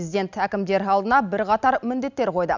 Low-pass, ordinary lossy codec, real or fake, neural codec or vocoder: 7.2 kHz; none; real; none